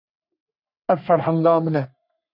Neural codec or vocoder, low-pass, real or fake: codec, 44.1 kHz, 3.4 kbps, Pupu-Codec; 5.4 kHz; fake